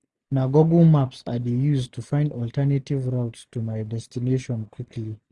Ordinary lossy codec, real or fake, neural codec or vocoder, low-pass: none; real; none; none